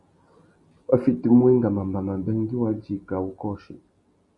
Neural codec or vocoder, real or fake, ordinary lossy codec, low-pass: none; real; Opus, 64 kbps; 10.8 kHz